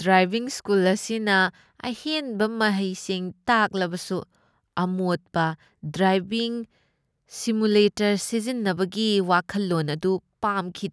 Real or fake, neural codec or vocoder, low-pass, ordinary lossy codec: real; none; none; none